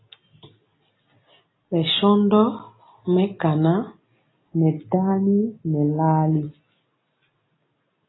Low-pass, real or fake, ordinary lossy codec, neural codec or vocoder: 7.2 kHz; real; AAC, 16 kbps; none